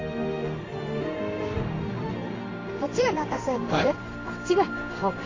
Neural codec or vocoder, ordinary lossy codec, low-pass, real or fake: codec, 16 kHz in and 24 kHz out, 1 kbps, XY-Tokenizer; AAC, 32 kbps; 7.2 kHz; fake